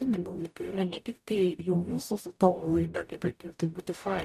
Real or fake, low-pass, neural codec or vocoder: fake; 14.4 kHz; codec, 44.1 kHz, 0.9 kbps, DAC